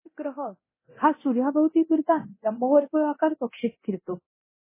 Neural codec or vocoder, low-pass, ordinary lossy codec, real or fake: codec, 24 kHz, 0.9 kbps, DualCodec; 3.6 kHz; MP3, 16 kbps; fake